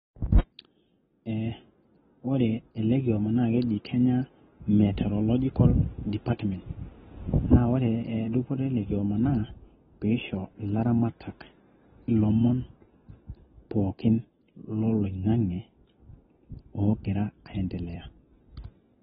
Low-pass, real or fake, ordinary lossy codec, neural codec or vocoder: 19.8 kHz; real; AAC, 16 kbps; none